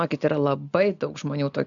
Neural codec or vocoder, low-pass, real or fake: none; 7.2 kHz; real